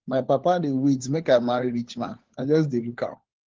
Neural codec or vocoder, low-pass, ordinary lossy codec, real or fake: codec, 16 kHz, 4 kbps, FunCodec, trained on LibriTTS, 50 frames a second; 7.2 kHz; Opus, 16 kbps; fake